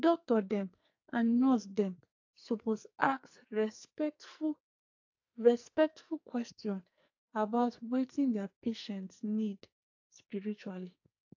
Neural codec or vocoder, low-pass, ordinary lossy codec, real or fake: codec, 44.1 kHz, 2.6 kbps, SNAC; 7.2 kHz; AAC, 48 kbps; fake